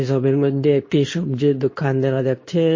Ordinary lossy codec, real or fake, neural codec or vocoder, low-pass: MP3, 32 kbps; fake; codec, 24 kHz, 0.9 kbps, WavTokenizer, medium speech release version 2; 7.2 kHz